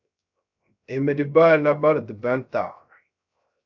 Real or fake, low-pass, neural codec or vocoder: fake; 7.2 kHz; codec, 16 kHz, 0.3 kbps, FocalCodec